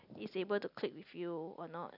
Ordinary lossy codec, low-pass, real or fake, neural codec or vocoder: none; 5.4 kHz; real; none